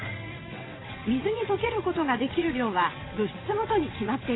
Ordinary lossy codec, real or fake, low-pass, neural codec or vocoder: AAC, 16 kbps; fake; 7.2 kHz; vocoder, 22.05 kHz, 80 mel bands, WaveNeXt